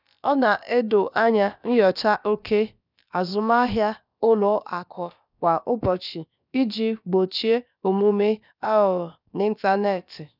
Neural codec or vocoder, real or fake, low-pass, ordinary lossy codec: codec, 16 kHz, about 1 kbps, DyCAST, with the encoder's durations; fake; 5.4 kHz; none